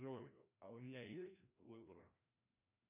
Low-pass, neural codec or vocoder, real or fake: 3.6 kHz; codec, 16 kHz, 1 kbps, FreqCodec, larger model; fake